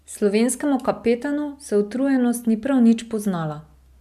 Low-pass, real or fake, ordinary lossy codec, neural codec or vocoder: 14.4 kHz; real; none; none